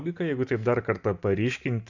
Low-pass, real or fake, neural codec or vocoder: 7.2 kHz; real; none